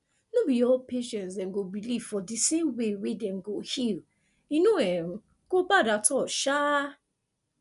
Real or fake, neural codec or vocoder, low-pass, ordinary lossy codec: real; none; 10.8 kHz; none